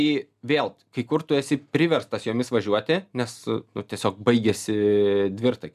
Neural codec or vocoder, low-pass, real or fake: none; 14.4 kHz; real